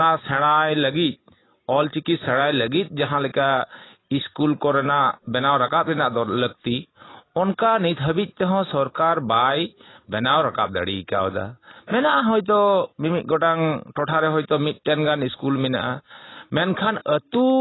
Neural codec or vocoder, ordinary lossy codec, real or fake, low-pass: none; AAC, 16 kbps; real; 7.2 kHz